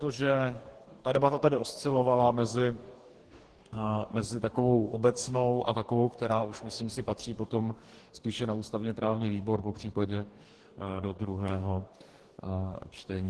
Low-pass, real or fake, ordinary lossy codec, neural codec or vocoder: 10.8 kHz; fake; Opus, 16 kbps; codec, 44.1 kHz, 2.6 kbps, DAC